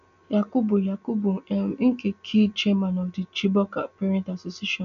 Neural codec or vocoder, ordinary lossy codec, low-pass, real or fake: none; none; 7.2 kHz; real